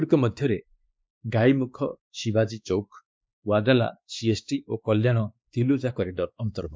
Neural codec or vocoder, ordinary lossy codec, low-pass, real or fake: codec, 16 kHz, 2 kbps, X-Codec, WavLM features, trained on Multilingual LibriSpeech; none; none; fake